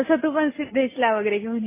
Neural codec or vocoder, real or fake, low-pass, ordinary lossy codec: none; real; 3.6 kHz; MP3, 16 kbps